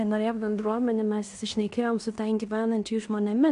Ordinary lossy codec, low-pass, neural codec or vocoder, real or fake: AAC, 64 kbps; 10.8 kHz; codec, 16 kHz in and 24 kHz out, 0.9 kbps, LongCat-Audio-Codec, fine tuned four codebook decoder; fake